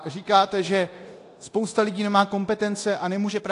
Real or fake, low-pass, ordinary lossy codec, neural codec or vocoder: fake; 10.8 kHz; AAC, 48 kbps; codec, 24 kHz, 0.9 kbps, DualCodec